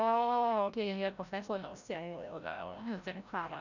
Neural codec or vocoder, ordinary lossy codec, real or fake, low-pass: codec, 16 kHz, 0.5 kbps, FreqCodec, larger model; none; fake; 7.2 kHz